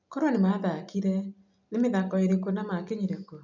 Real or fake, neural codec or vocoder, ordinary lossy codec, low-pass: real; none; none; 7.2 kHz